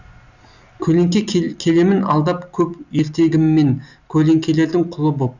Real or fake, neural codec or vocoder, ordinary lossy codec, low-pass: real; none; none; 7.2 kHz